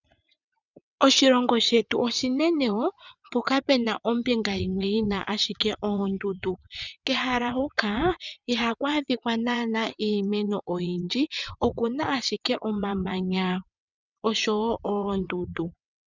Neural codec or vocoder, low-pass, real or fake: vocoder, 22.05 kHz, 80 mel bands, WaveNeXt; 7.2 kHz; fake